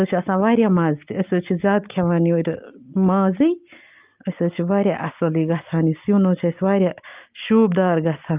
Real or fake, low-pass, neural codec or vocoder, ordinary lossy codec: real; 3.6 kHz; none; Opus, 24 kbps